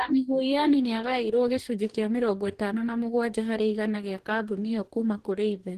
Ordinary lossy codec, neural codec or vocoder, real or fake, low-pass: Opus, 16 kbps; codec, 44.1 kHz, 2.6 kbps, DAC; fake; 14.4 kHz